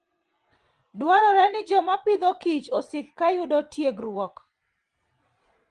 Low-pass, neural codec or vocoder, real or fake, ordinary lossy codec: 9.9 kHz; vocoder, 22.05 kHz, 80 mel bands, WaveNeXt; fake; Opus, 24 kbps